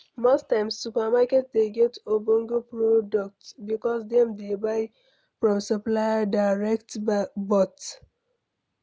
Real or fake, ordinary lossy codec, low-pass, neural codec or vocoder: real; none; none; none